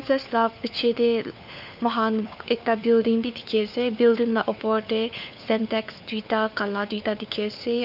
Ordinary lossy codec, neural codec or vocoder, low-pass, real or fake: none; codec, 16 kHz, 4 kbps, FunCodec, trained on LibriTTS, 50 frames a second; 5.4 kHz; fake